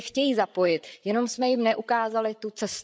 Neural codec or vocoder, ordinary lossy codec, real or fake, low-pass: codec, 16 kHz, 8 kbps, FreqCodec, larger model; none; fake; none